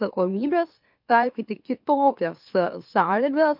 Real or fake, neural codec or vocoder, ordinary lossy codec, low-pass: fake; autoencoder, 44.1 kHz, a latent of 192 numbers a frame, MeloTTS; MP3, 48 kbps; 5.4 kHz